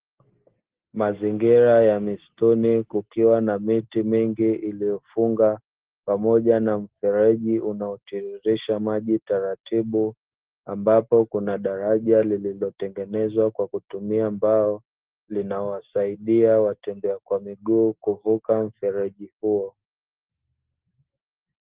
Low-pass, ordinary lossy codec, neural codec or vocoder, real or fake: 3.6 kHz; Opus, 16 kbps; none; real